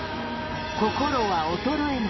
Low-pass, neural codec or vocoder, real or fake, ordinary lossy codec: 7.2 kHz; none; real; MP3, 24 kbps